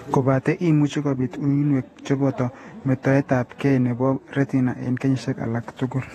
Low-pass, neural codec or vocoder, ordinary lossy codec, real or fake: 19.8 kHz; vocoder, 48 kHz, 128 mel bands, Vocos; AAC, 32 kbps; fake